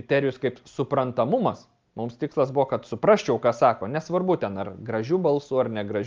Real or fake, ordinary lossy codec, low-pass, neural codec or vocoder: real; Opus, 32 kbps; 7.2 kHz; none